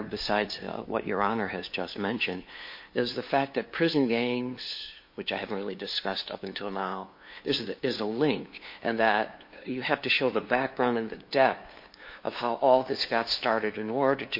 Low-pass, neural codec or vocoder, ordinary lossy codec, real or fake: 5.4 kHz; codec, 16 kHz, 2 kbps, FunCodec, trained on LibriTTS, 25 frames a second; MP3, 32 kbps; fake